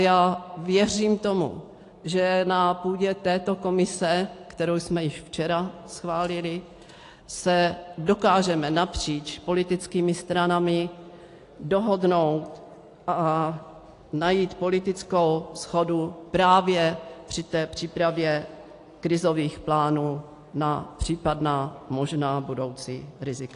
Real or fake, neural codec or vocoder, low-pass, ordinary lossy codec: real; none; 10.8 kHz; AAC, 48 kbps